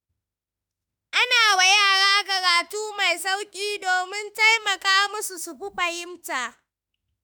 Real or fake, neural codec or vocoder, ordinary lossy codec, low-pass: fake; autoencoder, 48 kHz, 32 numbers a frame, DAC-VAE, trained on Japanese speech; none; none